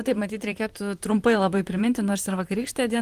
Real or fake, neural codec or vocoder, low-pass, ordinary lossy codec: fake; vocoder, 44.1 kHz, 128 mel bands, Pupu-Vocoder; 14.4 kHz; Opus, 24 kbps